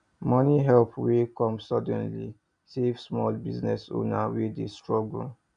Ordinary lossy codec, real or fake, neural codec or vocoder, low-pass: none; real; none; 9.9 kHz